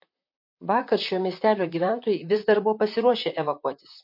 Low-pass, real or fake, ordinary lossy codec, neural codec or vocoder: 5.4 kHz; fake; MP3, 32 kbps; vocoder, 44.1 kHz, 128 mel bands, Pupu-Vocoder